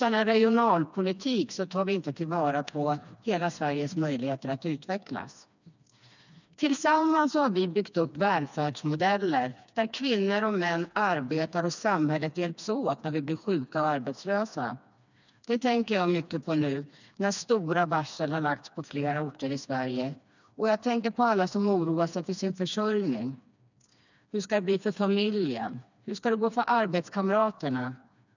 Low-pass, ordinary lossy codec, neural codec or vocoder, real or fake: 7.2 kHz; none; codec, 16 kHz, 2 kbps, FreqCodec, smaller model; fake